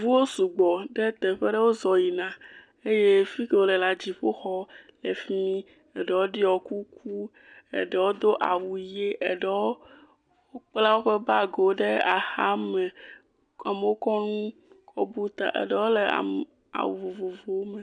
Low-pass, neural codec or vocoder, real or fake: 9.9 kHz; none; real